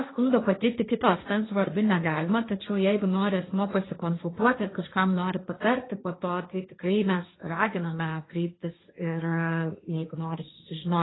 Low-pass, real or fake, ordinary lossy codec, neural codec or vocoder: 7.2 kHz; fake; AAC, 16 kbps; codec, 16 kHz, 1.1 kbps, Voila-Tokenizer